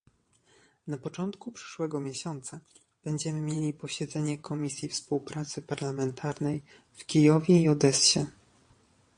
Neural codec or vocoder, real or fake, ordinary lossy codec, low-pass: vocoder, 22.05 kHz, 80 mel bands, WaveNeXt; fake; MP3, 48 kbps; 9.9 kHz